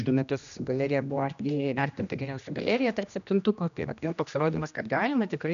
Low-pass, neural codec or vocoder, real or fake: 7.2 kHz; codec, 16 kHz, 1 kbps, X-Codec, HuBERT features, trained on general audio; fake